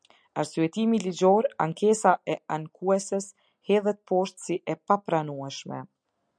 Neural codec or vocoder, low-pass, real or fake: none; 9.9 kHz; real